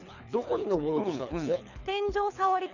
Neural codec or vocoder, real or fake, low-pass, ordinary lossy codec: codec, 24 kHz, 6 kbps, HILCodec; fake; 7.2 kHz; none